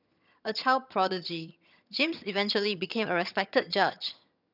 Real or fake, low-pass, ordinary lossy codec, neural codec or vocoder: fake; 5.4 kHz; none; vocoder, 22.05 kHz, 80 mel bands, HiFi-GAN